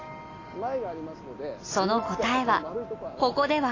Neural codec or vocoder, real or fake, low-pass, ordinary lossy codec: none; real; 7.2 kHz; AAC, 32 kbps